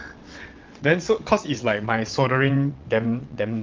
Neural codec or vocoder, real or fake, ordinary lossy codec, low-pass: vocoder, 22.05 kHz, 80 mel bands, WaveNeXt; fake; Opus, 32 kbps; 7.2 kHz